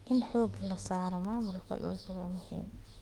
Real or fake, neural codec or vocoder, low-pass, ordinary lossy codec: fake; autoencoder, 48 kHz, 32 numbers a frame, DAC-VAE, trained on Japanese speech; 19.8 kHz; Opus, 32 kbps